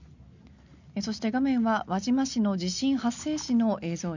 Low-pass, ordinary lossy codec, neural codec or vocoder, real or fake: 7.2 kHz; none; none; real